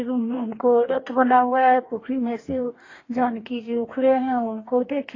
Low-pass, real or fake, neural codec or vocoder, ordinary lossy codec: 7.2 kHz; fake; codec, 44.1 kHz, 2.6 kbps, DAC; AAC, 32 kbps